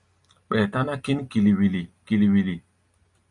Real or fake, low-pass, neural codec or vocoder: real; 10.8 kHz; none